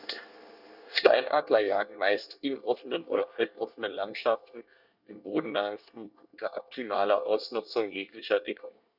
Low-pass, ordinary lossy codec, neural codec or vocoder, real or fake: 5.4 kHz; none; codec, 24 kHz, 1 kbps, SNAC; fake